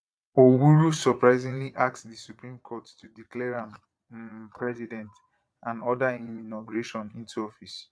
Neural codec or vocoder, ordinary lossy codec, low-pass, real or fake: vocoder, 22.05 kHz, 80 mel bands, Vocos; none; none; fake